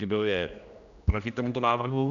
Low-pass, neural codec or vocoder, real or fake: 7.2 kHz; codec, 16 kHz, 1 kbps, X-Codec, HuBERT features, trained on general audio; fake